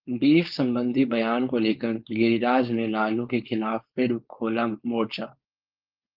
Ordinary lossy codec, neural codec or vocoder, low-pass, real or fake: Opus, 32 kbps; codec, 16 kHz, 4.8 kbps, FACodec; 5.4 kHz; fake